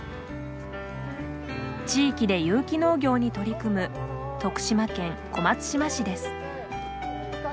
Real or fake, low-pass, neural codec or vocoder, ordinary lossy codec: real; none; none; none